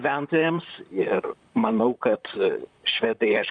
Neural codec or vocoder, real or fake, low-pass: vocoder, 44.1 kHz, 128 mel bands, Pupu-Vocoder; fake; 9.9 kHz